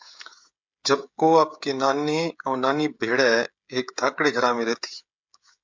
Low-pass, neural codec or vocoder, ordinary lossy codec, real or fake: 7.2 kHz; codec, 16 kHz, 16 kbps, FreqCodec, smaller model; MP3, 64 kbps; fake